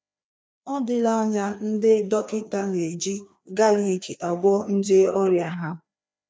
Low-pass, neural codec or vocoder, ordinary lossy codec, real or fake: none; codec, 16 kHz, 2 kbps, FreqCodec, larger model; none; fake